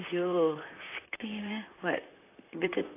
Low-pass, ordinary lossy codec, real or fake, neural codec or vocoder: 3.6 kHz; AAC, 24 kbps; fake; vocoder, 44.1 kHz, 128 mel bands, Pupu-Vocoder